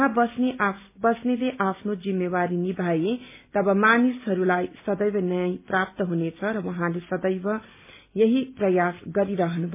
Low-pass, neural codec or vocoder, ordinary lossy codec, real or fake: 3.6 kHz; none; MP3, 24 kbps; real